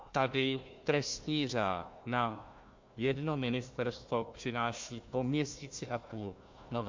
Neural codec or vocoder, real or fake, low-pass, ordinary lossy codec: codec, 16 kHz, 1 kbps, FunCodec, trained on Chinese and English, 50 frames a second; fake; 7.2 kHz; MP3, 48 kbps